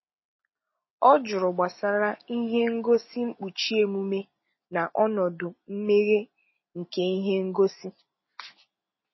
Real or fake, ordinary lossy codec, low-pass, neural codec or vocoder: real; MP3, 24 kbps; 7.2 kHz; none